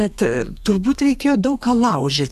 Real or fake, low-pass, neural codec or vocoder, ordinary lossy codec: fake; 14.4 kHz; codec, 32 kHz, 1.9 kbps, SNAC; AAC, 96 kbps